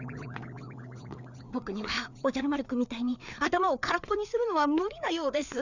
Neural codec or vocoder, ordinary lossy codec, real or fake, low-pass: codec, 16 kHz, 4 kbps, FreqCodec, larger model; none; fake; 7.2 kHz